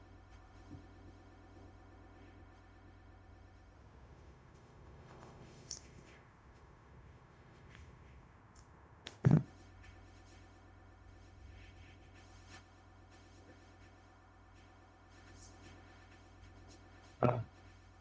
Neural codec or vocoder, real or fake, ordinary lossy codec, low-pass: codec, 16 kHz, 0.4 kbps, LongCat-Audio-Codec; fake; none; none